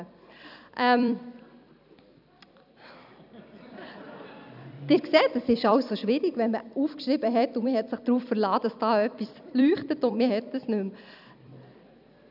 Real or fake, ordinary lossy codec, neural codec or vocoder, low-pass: fake; none; vocoder, 44.1 kHz, 128 mel bands every 256 samples, BigVGAN v2; 5.4 kHz